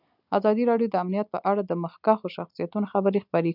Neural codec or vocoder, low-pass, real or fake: none; 5.4 kHz; real